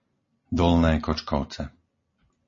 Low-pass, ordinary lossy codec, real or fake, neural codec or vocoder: 7.2 kHz; MP3, 32 kbps; real; none